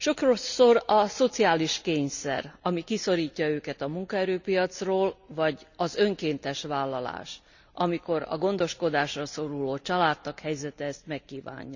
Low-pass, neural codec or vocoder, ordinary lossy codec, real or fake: 7.2 kHz; none; none; real